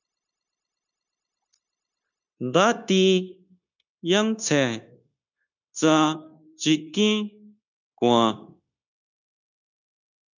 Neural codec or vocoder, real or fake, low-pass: codec, 16 kHz, 0.9 kbps, LongCat-Audio-Codec; fake; 7.2 kHz